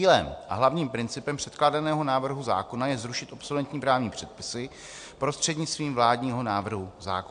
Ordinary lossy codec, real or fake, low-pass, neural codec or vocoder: AAC, 64 kbps; real; 9.9 kHz; none